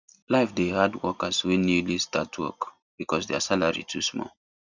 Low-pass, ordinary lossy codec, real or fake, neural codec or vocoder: 7.2 kHz; none; real; none